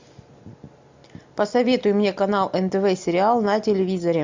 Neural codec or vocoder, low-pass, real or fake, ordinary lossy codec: none; 7.2 kHz; real; MP3, 64 kbps